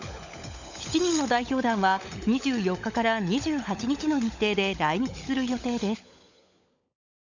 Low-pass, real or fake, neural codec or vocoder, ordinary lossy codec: 7.2 kHz; fake; codec, 16 kHz, 16 kbps, FunCodec, trained on LibriTTS, 50 frames a second; none